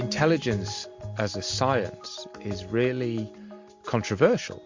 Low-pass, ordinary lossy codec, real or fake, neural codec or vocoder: 7.2 kHz; MP3, 48 kbps; real; none